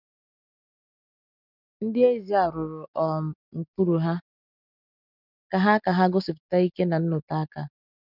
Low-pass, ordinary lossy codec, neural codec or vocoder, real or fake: 5.4 kHz; none; none; real